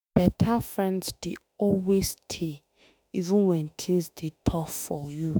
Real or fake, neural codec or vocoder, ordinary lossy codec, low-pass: fake; autoencoder, 48 kHz, 32 numbers a frame, DAC-VAE, trained on Japanese speech; none; none